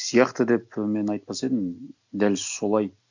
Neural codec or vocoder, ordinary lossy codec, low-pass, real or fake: none; none; 7.2 kHz; real